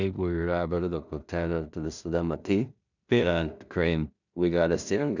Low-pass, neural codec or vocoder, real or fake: 7.2 kHz; codec, 16 kHz in and 24 kHz out, 0.4 kbps, LongCat-Audio-Codec, two codebook decoder; fake